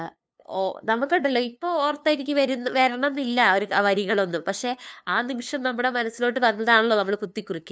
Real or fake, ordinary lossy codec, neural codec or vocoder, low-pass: fake; none; codec, 16 kHz, 2 kbps, FunCodec, trained on LibriTTS, 25 frames a second; none